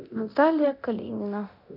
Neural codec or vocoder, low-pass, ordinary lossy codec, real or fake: codec, 24 kHz, 0.9 kbps, DualCodec; 5.4 kHz; none; fake